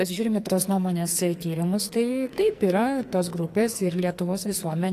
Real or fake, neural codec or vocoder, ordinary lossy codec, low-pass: fake; codec, 44.1 kHz, 2.6 kbps, SNAC; AAC, 64 kbps; 14.4 kHz